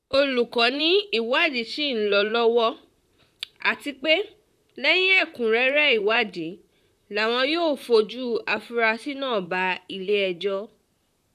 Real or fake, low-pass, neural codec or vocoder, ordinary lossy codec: fake; 14.4 kHz; vocoder, 44.1 kHz, 128 mel bands, Pupu-Vocoder; none